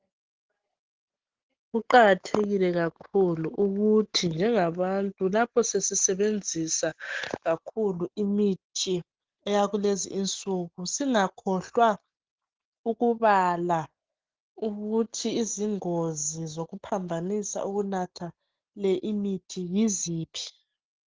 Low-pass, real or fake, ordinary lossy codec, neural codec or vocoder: 7.2 kHz; real; Opus, 16 kbps; none